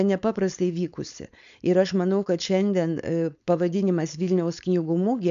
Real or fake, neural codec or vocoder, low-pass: fake; codec, 16 kHz, 4.8 kbps, FACodec; 7.2 kHz